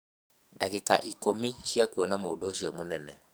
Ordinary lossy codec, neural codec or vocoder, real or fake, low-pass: none; codec, 44.1 kHz, 2.6 kbps, SNAC; fake; none